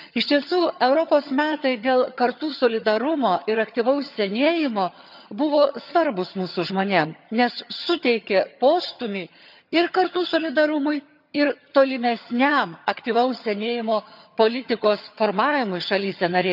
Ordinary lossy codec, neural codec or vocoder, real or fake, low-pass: none; vocoder, 22.05 kHz, 80 mel bands, HiFi-GAN; fake; 5.4 kHz